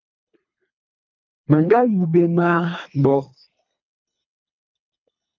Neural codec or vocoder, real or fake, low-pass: codec, 24 kHz, 3 kbps, HILCodec; fake; 7.2 kHz